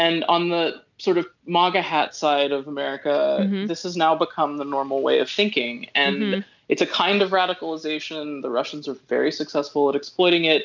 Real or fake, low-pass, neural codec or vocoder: real; 7.2 kHz; none